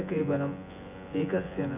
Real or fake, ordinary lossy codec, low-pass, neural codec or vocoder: fake; none; 3.6 kHz; vocoder, 24 kHz, 100 mel bands, Vocos